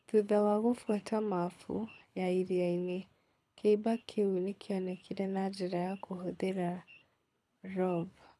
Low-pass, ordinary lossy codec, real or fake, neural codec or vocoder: none; none; fake; codec, 24 kHz, 6 kbps, HILCodec